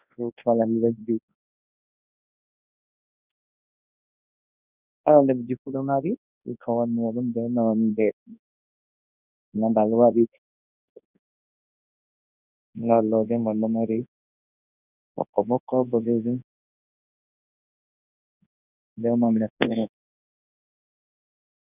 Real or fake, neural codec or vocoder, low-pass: fake; codec, 24 kHz, 0.9 kbps, WavTokenizer, large speech release; 3.6 kHz